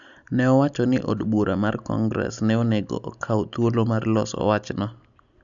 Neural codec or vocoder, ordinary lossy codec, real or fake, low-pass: none; none; real; 7.2 kHz